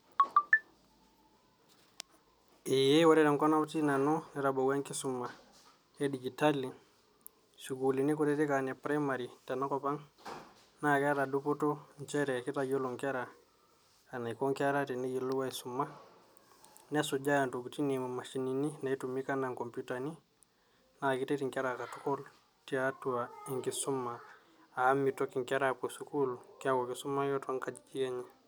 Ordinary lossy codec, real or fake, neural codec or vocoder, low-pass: none; real; none; none